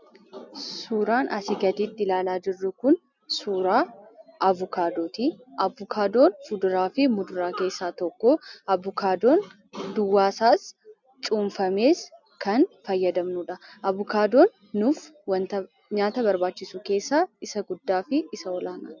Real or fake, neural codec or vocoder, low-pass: real; none; 7.2 kHz